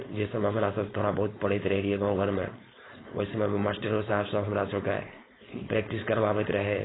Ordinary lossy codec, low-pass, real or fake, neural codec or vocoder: AAC, 16 kbps; 7.2 kHz; fake; codec, 16 kHz, 4.8 kbps, FACodec